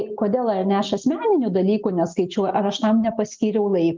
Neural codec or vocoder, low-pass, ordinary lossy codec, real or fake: none; 7.2 kHz; Opus, 32 kbps; real